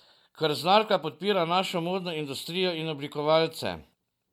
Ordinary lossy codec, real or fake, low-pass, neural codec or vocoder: MP3, 96 kbps; real; 19.8 kHz; none